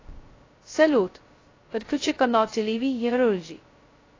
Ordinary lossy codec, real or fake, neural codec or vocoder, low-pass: AAC, 32 kbps; fake; codec, 16 kHz, 0.2 kbps, FocalCodec; 7.2 kHz